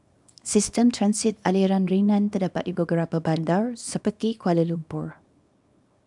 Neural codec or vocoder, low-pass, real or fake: codec, 24 kHz, 0.9 kbps, WavTokenizer, small release; 10.8 kHz; fake